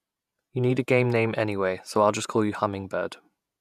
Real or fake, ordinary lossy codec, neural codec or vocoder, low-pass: fake; none; vocoder, 48 kHz, 128 mel bands, Vocos; 14.4 kHz